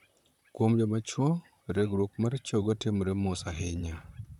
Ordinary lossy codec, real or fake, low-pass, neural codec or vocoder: none; fake; 19.8 kHz; vocoder, 44.1 kHz, 128 mel bands, Pupu-Vocoder